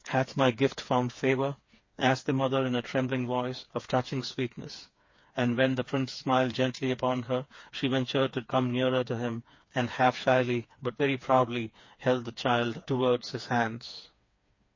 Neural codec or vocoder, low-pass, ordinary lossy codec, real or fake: codec, 16 kHz, 4 kbps, FreqCodec, smaller model; 7.2 kHz; MP3, 32 kbps; fake